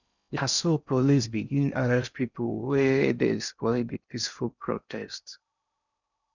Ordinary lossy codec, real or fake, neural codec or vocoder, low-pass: none; fake; codec, 16 kHz in and 24 kHz out, 0.6 kbps, FocalCodec, streaming, 4096 codes; 7.2 kHz